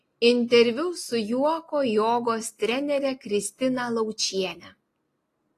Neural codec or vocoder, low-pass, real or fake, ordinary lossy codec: none; 14.4 kHz; real; AAC, 48 kbps